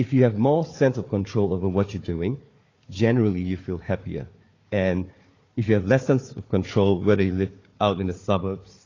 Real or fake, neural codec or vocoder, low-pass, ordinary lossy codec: fake; codec, 16 kHz, 4 kbps, FunCodec, trained on Chinese and English, 50 frames a second; 7.2 kHz; AAC, 32 kbps